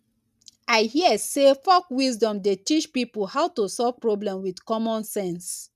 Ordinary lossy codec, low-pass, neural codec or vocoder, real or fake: none; 14.4 kHz; none; real